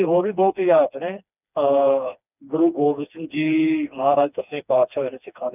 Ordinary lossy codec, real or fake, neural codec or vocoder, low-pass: none; fake; codec, 16 kHz, 2 kbps, FreqCodec, smaller model; 3.6 kHz